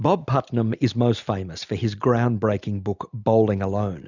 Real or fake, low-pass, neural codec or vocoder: real; 7.2 kHz; none